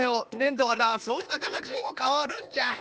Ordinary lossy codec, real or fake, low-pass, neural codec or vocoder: none; fake; none; codec, 16 kHz, 0.8 kbps, ZipCodec